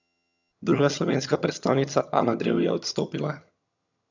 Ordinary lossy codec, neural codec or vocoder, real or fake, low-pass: none; vocoder, 22.05 kHz, 80 mel bands, HiFi-GAN; fake; 7.2 kHz